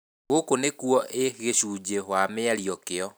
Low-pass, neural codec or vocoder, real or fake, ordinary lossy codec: none; none; real; none